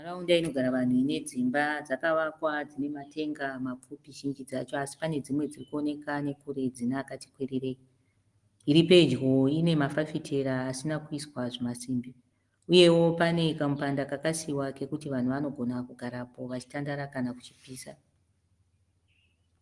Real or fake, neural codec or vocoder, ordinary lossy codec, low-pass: real; none; Opus, 24 kbps; 10.8 kHz